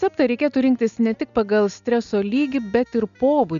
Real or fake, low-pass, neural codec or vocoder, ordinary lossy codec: real; 7.2 kHz; none; MP3, 96 kbps